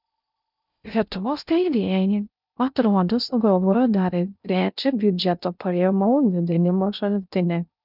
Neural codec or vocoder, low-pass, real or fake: codec, 16 kHz in and 24 kHz out, 0.6 kbps, FocalCodec, streaming, 2048 codes; 5.4 kHz; fake